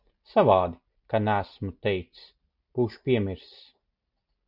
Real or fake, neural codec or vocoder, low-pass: real; none; 5.4 kHz